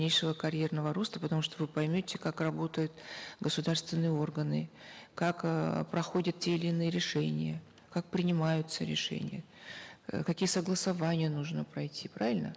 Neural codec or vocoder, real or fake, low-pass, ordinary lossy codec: none; real; none; none